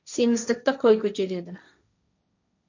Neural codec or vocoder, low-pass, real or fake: codec, 16 kHz, 1.1 kbps, Voila-Tokenizer; 7.2 kHz; fake